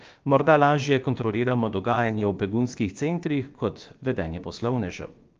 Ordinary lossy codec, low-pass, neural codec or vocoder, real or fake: Opus, 24 kbps; 7.2 kHz; codec, 16 kHz, about 1 kbps, DyCAST, with the encoder's durations; fake